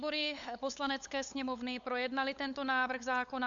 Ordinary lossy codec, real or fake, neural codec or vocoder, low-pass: AAC, 64 kbps; fake; codec, 16 kHz, 8 kbps, FunCodec, trained on LibriTTS, 25 frames a second; 7.2 kHz